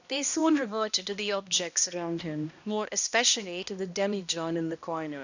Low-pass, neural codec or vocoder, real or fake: 7.2 kHz; codec, 16 kHz, 1 kbps, X-Codec, HuBERT features, trained on balanced general audio; fake